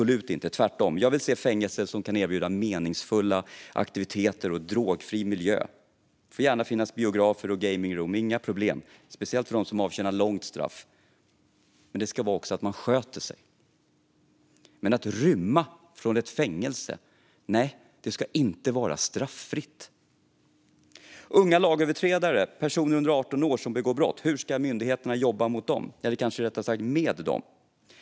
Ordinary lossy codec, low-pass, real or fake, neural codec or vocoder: none; none; real; none